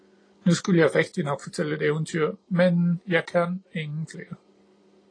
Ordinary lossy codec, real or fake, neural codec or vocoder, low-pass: AAC, 32 kbps; real; none; 9.9 kHz